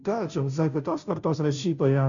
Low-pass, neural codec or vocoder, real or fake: 7.2 kHz; codec, 16 kHz, 0.5 kbps, FunCodec, trained on Chinese and English, 25 frames a second; fake